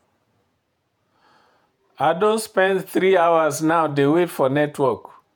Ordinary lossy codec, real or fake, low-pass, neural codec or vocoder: none; fake; none; vocoder, 48 kHz, 128 mel bands, Vocos